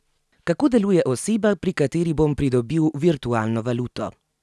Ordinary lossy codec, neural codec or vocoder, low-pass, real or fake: none; none; none; real